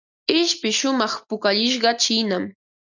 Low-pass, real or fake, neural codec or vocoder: 7.2 kHz; real; none